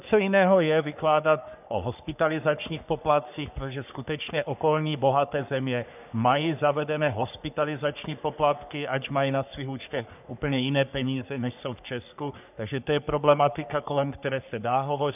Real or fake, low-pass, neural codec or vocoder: fake; 3.6 kHz; codec, 44.1 kHz, 3.4 kbps, Pupu-Codec